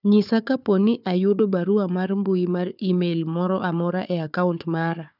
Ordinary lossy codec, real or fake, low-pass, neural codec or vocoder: none; fake; 5.4 kHz; codec, 16 kHz, 4 kbps, FunCodec, trained on Chinese and English, 50 frames a second